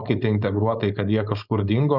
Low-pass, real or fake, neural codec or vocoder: 5.4 kHz; fake; codec, 16 kHz, 4.8 kbps, FACodec